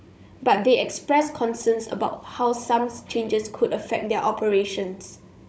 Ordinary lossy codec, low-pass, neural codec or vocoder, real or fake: none; none; codec, 16 kHz, 16 kbps, FunCodec, trained on Chinese and English, 50 frames a second; fake